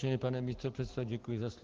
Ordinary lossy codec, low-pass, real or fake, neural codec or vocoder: Opus, 16 kbps; 7.2 kHz; real; none